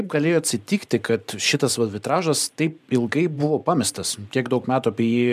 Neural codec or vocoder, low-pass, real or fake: vocoder, 44.1 kHz, 128 mel bands every 512 samples, BigVGAN v2; 14.4 kHz; fake